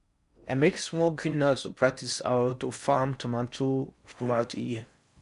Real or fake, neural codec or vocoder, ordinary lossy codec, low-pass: fake; codec, 16 kHz in and 24 kHz out, 0.6 kbps, FocalCodec, streaming, 4096 codes; none; 10.8 kHz